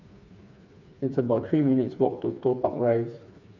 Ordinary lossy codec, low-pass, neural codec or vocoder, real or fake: none; 7.2 kHz; codec, 16 kHz, 4 kbps, FreqCodec, smaller model; fake